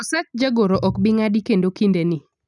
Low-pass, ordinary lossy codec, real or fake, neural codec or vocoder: 10.8 kHz; none; real; none